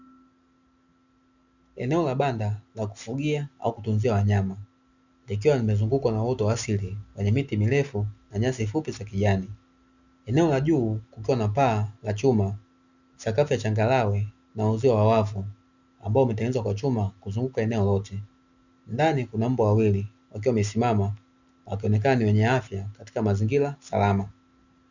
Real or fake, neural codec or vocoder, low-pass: real; none; 7.2 kHz